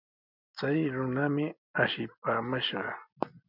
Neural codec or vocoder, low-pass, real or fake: none; 5.4 kHz; real